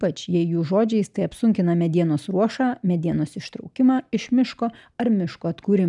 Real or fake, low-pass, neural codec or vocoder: real; 10.8 kHz; none